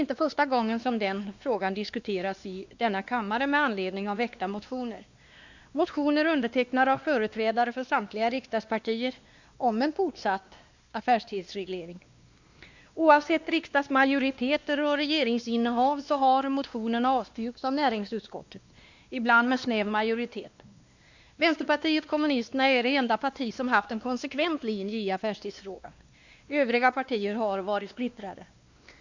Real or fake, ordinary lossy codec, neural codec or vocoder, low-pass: fake; Opus, 64 kbps; codec, 16 kHz, 2 kbps, X-Codec, WavLM features, trained on Multilingual LibriSpeech; 7.2 kHz